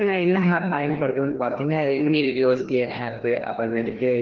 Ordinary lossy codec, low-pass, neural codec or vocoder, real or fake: Opus, 16 kbps; 7.2 kHz; codec, 16 kHz, 1 kbps, FreqCodec, larger model; fake